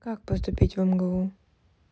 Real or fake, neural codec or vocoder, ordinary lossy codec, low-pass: real; none; none; none